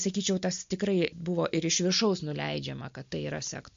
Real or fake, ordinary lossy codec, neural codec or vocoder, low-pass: real; MP3, 48 kbps; none; 7.2 kHz